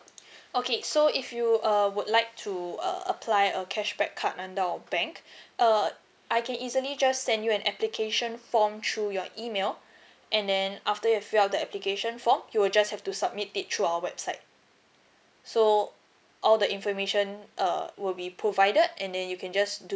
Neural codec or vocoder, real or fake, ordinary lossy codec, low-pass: none; real; none; none